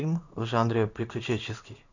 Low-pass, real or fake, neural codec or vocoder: 7.2 kHz; fake; codec, 16 kHz in and 24 kHz out, 1 kbps, XY-Tokenizer